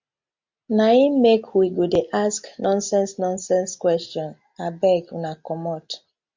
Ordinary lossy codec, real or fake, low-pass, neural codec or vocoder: MP3, 64 kbps; real; 7.2 kHz; none